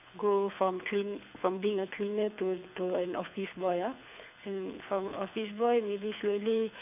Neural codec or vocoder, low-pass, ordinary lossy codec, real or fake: codec, 24 kHz, 6 kbps, HILCodec; 3.6 kHz; none; fake